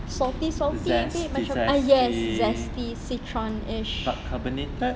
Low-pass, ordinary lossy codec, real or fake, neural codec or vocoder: none; none; real; none